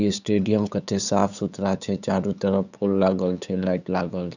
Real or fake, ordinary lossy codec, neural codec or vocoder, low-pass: fake; none; codec, 16 kHz, 8 kbps, FunCodec, trained on LibriTTS, 25 frames a second; 7.2 kHz